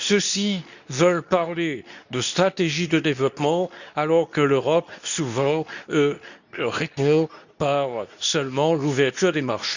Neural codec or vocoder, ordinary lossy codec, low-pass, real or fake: codec, 24 kHz, 0.9 kbps, WavTokenizer, medium speech release version 2; none; 7.2 kHz; fake